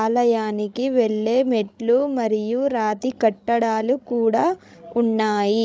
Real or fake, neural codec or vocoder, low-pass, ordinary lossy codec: fake; codec, 16 kHz, 16 kbps, FreqCodec, larger model; none; none